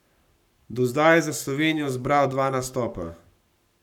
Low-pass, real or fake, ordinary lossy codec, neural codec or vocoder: 19.8 kHz; fake; none; codec, 44.1 kHz, 7.8 kbps, Pupu-Codec